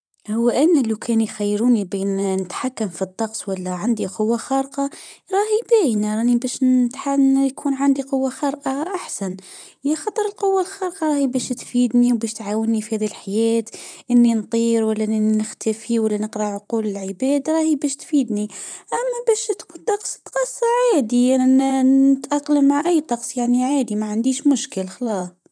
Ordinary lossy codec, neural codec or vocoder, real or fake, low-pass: none; vocoder, 44.1 kHz, 128 mel bands, Pupu-Vocoder; fake; 9.9 kHz